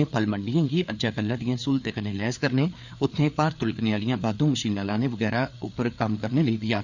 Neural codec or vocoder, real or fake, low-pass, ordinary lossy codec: codec, 16 kHz, 4 kbps, FreqCodec, larger model; fake; 7.2 kHz; none